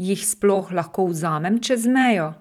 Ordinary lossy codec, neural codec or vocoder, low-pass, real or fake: none; vocoder, 44.1 kHz, 128 mel bands every 512 samples, BigVGAN v2; 19.8 kHz; fake